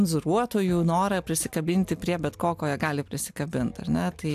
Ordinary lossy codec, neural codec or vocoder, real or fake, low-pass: AAC, 96 kbps; none; real; 14.4 kHz